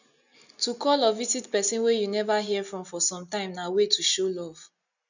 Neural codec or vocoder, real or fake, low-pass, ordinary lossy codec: none; real; 7.2 kHz; none